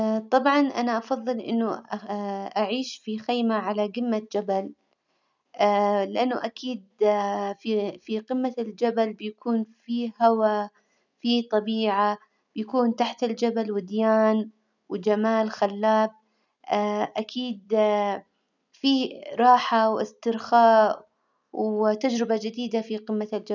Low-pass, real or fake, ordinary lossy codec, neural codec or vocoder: 7.2 kHz; real; none; none